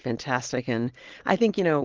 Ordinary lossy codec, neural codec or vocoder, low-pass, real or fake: Opus, 16 kbps; none; 7.2 kHz; real